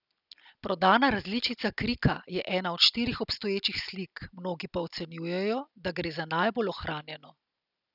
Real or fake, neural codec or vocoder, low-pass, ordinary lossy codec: real; none; 5.4 kHz; none